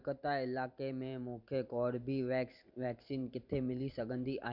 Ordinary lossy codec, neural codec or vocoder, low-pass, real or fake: none; vocoder, 44.1 kHz, 128 mel bands every 512 samples, BigVGAN v2; 5.4 kHz; fake